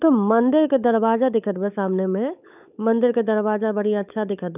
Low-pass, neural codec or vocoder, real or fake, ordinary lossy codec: 3.6 kHz; codec, 16 kHz, 16 kbps, FunCodec, trained on LibriTTS, 50 frames a second; fake; none